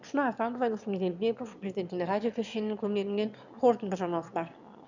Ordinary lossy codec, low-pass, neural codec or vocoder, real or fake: none; 7.2 kHz; autoencoder, 22.05 kHz, a latent of 192 numbers a frame, VITS, trained on one speaker; fake